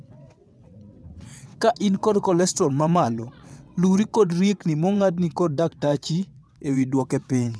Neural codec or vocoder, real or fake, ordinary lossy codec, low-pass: vocoder, 22.05 kHz, 80 mel bands, WaveNeXt; fake; none; none